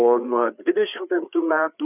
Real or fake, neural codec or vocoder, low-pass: fake; codec, 16 kHz, 4 kbps, FreqCodec, larger model; 3.6 kHz